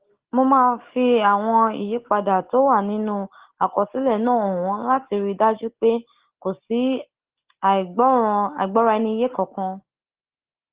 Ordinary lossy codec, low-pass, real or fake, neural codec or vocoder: Opus, 16 kbps; 3.6 kHz; real; none